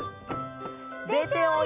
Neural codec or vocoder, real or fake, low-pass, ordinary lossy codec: none; real; 3.6 kHz; none